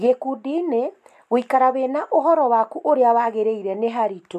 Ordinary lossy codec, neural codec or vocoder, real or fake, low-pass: none; none; real; 14.4 kHz